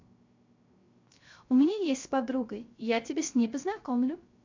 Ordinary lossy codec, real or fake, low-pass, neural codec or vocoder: MP3, 64 kbps; fake; 7.2 kHz; codec, 16 kHz, 0.3 kbps, FocalCodec